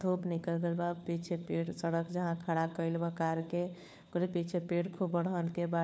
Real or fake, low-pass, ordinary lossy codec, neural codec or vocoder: fake; none; none; codec, 16 kHz, 4 kbps, FunCodec, trained on LibriTTS, 50 frames a second